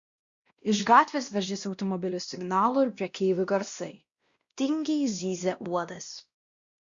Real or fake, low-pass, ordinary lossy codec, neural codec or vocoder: fake; 7.2 kHz; Opus, 64 kbps; codec, 16 kHz, 1 kbps, X-Codec, WavLM features, trained on Multilingual LibriSpeech